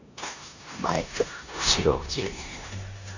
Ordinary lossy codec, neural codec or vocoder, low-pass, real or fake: none; codec, 16 kHz in and 24 kHz out, 0.9 kbps, LongCat-Audio-Codec, four codebook decoder; 7.2 kHz; fake